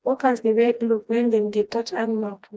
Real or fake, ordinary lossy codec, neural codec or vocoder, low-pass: fake; none; codec, 16 kHz, 1 kbps, FreqCodec, smaller model; none